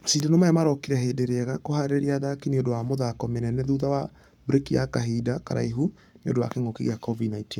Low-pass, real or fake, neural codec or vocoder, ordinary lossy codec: 19.8 kHz; fake; codec, 44.1 kHz, 7.8 kbps, Pupu-Codec; none